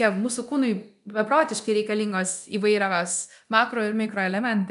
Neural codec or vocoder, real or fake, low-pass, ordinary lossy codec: codec, 24 kHz, 0.9 kbps, DualCodec; fake; 10.8 kHz; MP3, 96 kbps